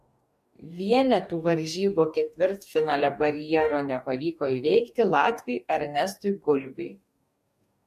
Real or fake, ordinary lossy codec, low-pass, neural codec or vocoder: fake; MP3, 64 kbps; 14.4 kHz; codec, 44.1 kHz, 2.6 kbps, DAC